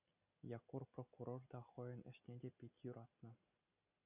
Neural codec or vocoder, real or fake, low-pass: none; real; 3.6 kHz